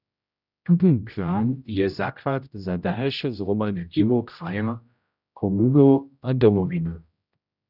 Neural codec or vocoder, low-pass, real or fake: codec, 16 kHz, 0.5 kbps, X-Codec, HuBERT features, trained on general audio; 5.4 kHz; fake